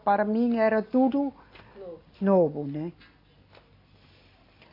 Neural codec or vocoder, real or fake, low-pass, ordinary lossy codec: none; real; 5.4 kHz; AAC, 24 kbps